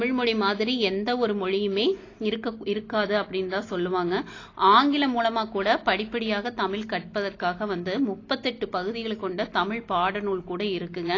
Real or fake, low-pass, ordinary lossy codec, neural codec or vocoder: real; 7.2 kHz; AAC, 32 kbps; none